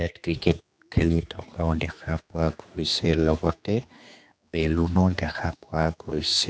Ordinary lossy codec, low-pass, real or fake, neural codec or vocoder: none; none; fake; codec, 16 kHz, 2 kbps, X-Codec, HuBERT features, trained on general audio